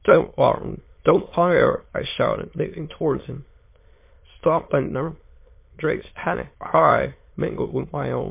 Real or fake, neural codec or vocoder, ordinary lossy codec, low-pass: fake; autoencoder, 22.05 kHz, a latent of 192 numbers a frame, VITS, trained on many speakers; MP3, 24 kbps; 3.6 kHz